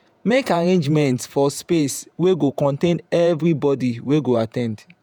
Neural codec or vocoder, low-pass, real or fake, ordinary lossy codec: vocoder, 48 kHz, 128 mel bands, Vocos; none; fake; none